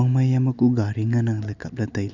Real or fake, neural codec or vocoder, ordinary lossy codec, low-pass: real; none; none; 7.2 kHz